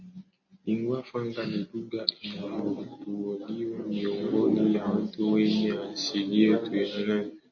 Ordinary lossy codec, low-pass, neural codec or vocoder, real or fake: MP3, 32 kbps; 7.2 kHz; none; real